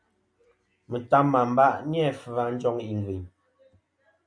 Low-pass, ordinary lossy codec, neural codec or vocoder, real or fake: 9.9 kHz; MP3, 64 kbps; none; real